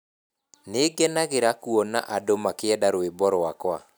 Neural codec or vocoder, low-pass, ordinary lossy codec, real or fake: none; none; none; real